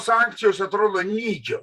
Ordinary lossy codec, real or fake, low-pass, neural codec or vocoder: Opus, 64 kbps; fake; 14.4 kHz; vocoder, 44.1 kHz, 128 mel bands every 512 samples, BigVGAN v2